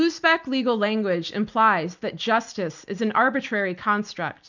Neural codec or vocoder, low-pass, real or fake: none; 7.2 kHz; real